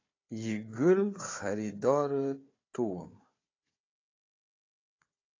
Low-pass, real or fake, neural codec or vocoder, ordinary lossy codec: 7.2 kHz; fake; codec, 16 kHz, 4 kbps, FunCodec, trained on Chinese and English, 50 frames a second; AAC, 32 kbps